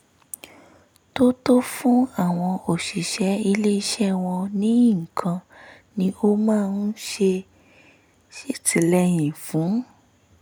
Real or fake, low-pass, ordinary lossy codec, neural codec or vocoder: real; none; none; none